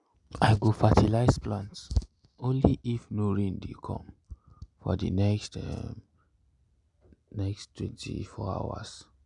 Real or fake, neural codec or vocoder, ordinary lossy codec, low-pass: real; none; none; 10.8 kHz